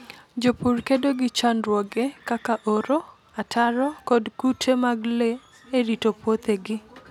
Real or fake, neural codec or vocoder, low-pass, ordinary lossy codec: real; none; 19.8 kHz; none